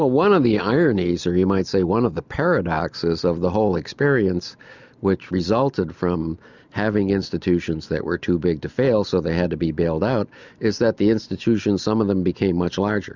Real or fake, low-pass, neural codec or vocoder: real; 7.2 kHz; none